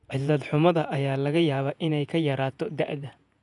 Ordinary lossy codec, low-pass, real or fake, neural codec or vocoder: none; 10.8 kHz; fake; vocoder, 48 kHz, 128 mel bands, Vocos